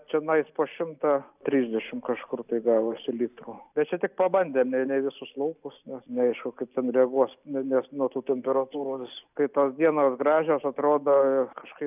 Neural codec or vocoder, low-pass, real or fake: none; 3.6 kHz; real